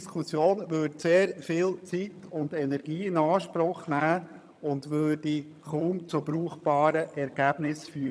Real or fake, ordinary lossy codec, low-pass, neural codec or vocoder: fake; none; none; vocoder, 22.05 kHz, 80 mel bands, HiFi-GAN